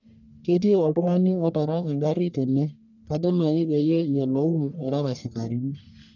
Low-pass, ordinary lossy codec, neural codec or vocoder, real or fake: 7.2 kHz; none; codec, 44.1 kHz, 1.7 kbps, Pupu-Codec; fake